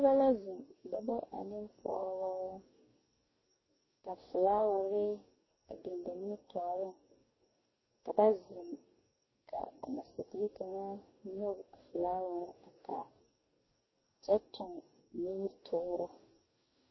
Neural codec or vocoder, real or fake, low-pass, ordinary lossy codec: codec, 44.1 kHz, 2.6 kbps, DAC; fake; 7.2 kHz; MP3, 24 kbps